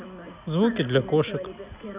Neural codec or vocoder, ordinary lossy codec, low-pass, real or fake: none; Opus, 64 kbps; 3.6 kHz; real